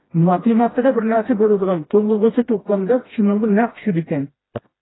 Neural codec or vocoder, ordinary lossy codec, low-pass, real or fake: codec, 16 kHz, 1 kbps, FreqCodec, smaller model; AAC, 16 kbps; 7.2 kHz; fake